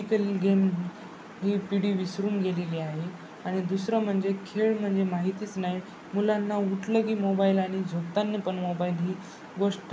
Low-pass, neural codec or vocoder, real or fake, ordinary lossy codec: none; none; real; none